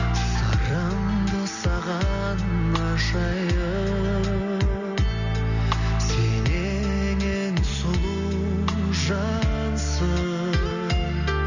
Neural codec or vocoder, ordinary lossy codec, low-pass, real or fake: none; none; 7.2 kHz; real